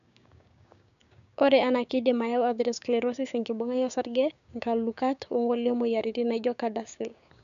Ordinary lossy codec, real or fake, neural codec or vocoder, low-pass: none; fake; codec, 16 kHz, 6 kbps, DAC; 7.2 kHz